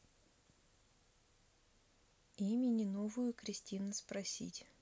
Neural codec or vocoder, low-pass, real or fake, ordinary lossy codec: none; none; real; none